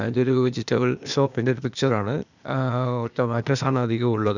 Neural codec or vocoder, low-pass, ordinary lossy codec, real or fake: codec, 16 kHz, 0.8 kbps, ZipCodec; 7.2 kHz; none; fake